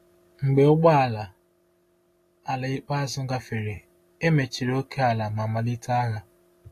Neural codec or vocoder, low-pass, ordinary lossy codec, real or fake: none; 14.4 kHz; AAC, 48 kbps; real